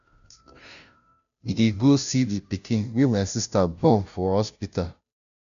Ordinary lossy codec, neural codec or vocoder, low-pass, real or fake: MP3, 96 kbps; codec, 16 kHz, 0.5 kbps, FunCodec, trained on Chinese and English, 25 frames a second; 7.2 kHz; fake